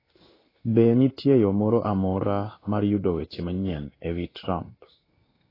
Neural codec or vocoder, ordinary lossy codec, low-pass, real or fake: codec, 16 kHz in and 24 kHz out, 1 kbps, XY-Tokenizer; AAC, 24 kbps; 5.4 kHz; fake